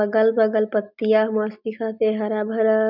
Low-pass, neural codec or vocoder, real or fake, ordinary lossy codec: 5.4 kHz; none; real; none